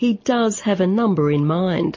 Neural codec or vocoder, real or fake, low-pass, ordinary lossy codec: none; real; 7.2 kHz; MP3, 32 kbps